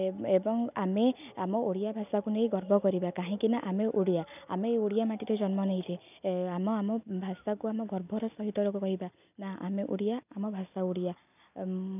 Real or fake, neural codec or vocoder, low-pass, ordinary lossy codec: real; none; 3.6 kHz; none